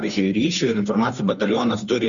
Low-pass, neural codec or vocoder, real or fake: 7.2 kHz; codec, 16 kHz, 2 kbps, FunCodec, trained on Chinese and English, 25 frames a second; fake